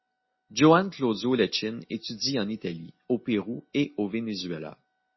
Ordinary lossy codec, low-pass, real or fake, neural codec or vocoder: MP3, 24 kbps; 7.2 kHz; real; none